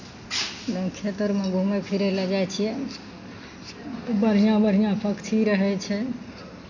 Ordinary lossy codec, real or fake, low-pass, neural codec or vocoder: none; real; 7.2 kHz; none